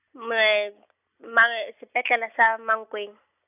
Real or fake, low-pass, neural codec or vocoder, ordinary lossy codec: real; 3.6 kHz; none; none